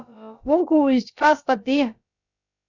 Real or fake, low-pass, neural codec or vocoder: fake; 7.2 kHz; codec, 16 kHz, about 1 kbps, DyCAST, with the encoder's durations